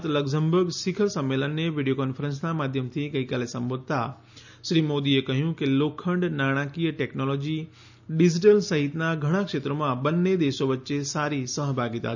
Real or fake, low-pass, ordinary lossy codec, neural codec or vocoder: real; 7.2 kHz; none; none